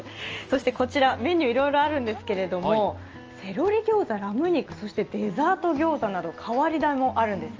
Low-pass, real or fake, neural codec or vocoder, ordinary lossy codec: 7.2 kHz; real; none; Opus, 24 kbps